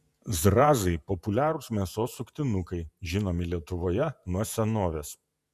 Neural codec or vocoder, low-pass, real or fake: vocoder, 48 kHz, 128 mel bands, Vocos; 14.4 kHz; fake